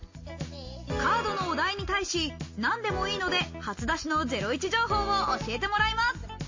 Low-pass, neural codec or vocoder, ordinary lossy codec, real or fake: 7.2 kHz; none; MP3, 32 kbps; real